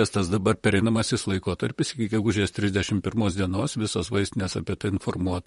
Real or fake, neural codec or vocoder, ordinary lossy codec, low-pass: fake; vocoder, 44.1 kHz, 128 mel bands, Pupu-Vocoder; MP3, 48 kbps; 19.8 kHz